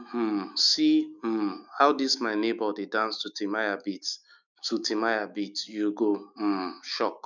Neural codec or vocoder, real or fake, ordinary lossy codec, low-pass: autoencoder, 48 kHz, 128 numbers a frame, DAC-VAE, trained on Japanese speech; fake; none; 7.2 kHz